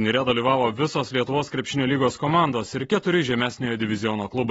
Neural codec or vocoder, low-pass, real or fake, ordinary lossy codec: none; 19.8 kHz; real; AAC, 24 kbps